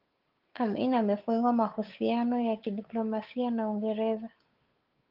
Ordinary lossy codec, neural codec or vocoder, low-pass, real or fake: Opus, 32 kbps; codec, 16 kHz, 8 kbps, FunCodec, trained on Chinese and English, 25 frames a second; 5.4 kHz; fake